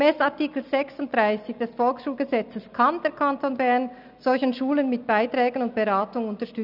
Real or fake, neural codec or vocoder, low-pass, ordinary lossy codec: real; none; 5.4 kHz; none